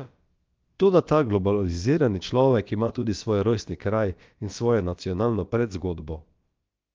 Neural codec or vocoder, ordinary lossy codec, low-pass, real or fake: codec, 16 kHz, about 1 kbps, DyCAST, with the encoder's durations; Opus, 24 kbps; 7.2 kHz; fake